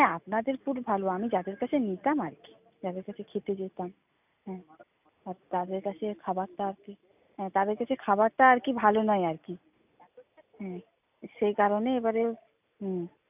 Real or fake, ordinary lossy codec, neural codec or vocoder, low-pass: real; none; none; 3.6 kHz